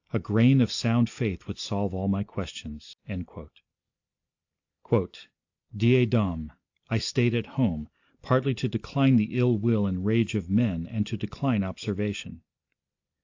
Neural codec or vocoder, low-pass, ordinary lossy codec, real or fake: none; 7.2 kHz; AAC, 48 kbps; real